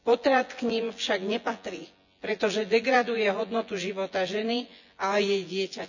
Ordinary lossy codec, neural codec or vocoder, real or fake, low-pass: none; vocoder, 24 kHz, 100 mel bands, Vocos; fake; 7.2 kHz